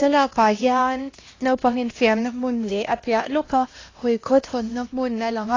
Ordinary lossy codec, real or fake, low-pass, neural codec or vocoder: AAC, 32 kbps; fake; 7.2 kHz; codec, 16 kHz, 1 kbps, X-Codec, HuBERT features, trained on LibriSpeech